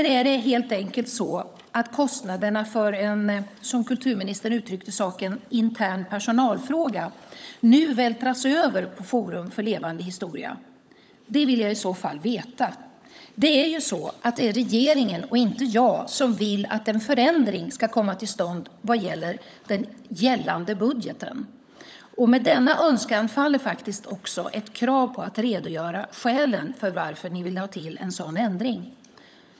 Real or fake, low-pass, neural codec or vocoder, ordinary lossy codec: fake; none; codec, 16 kHz, 16 kbps, FunCodec, trained on LibriTTS, 50 frames a second; none